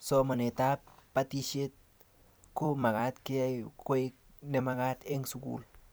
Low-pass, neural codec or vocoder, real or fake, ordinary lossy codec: none; vocoder, 44.1 kHz, 128 mel bands every 256 samples, BigVGAN v2; fake; none